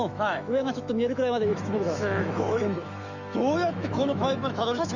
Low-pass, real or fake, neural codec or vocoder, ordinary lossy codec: 7.2 kHz; fake; autoencoder, 48 kHz, 128 numbers a frame, DAC-VAE, trained on Japanese speech; none